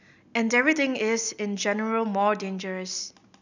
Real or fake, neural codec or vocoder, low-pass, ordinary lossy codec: real; none; 7.2 kHz; none